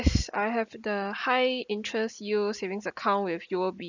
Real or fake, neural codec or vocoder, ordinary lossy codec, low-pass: real; none; none; 7.2 kHz